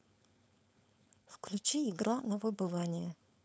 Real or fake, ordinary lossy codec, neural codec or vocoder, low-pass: fake; none; codec, 16 kHz, 4.8 kbps, FACodec; none